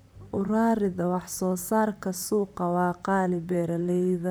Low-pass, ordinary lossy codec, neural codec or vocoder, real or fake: none; none; vocoder, 44.1 kHz, 128 mel bands, Pupu-Vocoder; fake